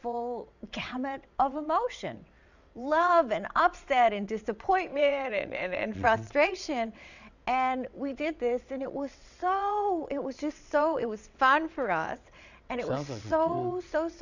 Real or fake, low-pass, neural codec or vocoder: fake; 7.2 kHz; vocoder, 22.05 kHz, 80 mel bands, WaveNeXt